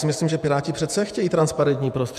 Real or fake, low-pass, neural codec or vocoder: fake; 14.4 kHz; vocoder, 48 kHz, 128 mel bands, Vocos